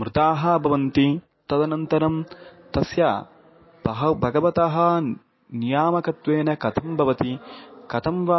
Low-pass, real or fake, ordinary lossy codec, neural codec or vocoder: 7.2 kHz; real; MP3, 24 kbps; none